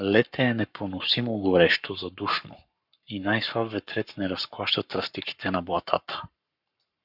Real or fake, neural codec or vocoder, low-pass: fake; codec, 44.1 kHz, 7.8 kbps, Pupu-Codec; 5.4 kHz